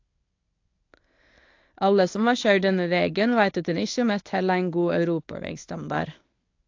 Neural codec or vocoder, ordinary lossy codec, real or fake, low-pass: codec, 24 kHz, 0.9 kbps, WavTokenizer, medium speech release version 1; AAC, 48 kbps; fake; 7.2 kHz